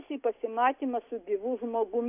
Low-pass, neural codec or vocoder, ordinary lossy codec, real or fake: 3.6 kHz; none; AAC, 32 kbps; real